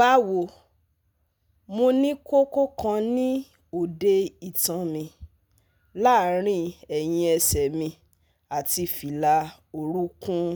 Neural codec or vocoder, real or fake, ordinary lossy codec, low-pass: none; real; none; none